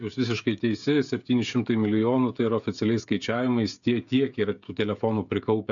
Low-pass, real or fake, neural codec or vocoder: 7.2 kHz; fake; codec, 16 kHz, 16 kbps, FreqCodec, smaller model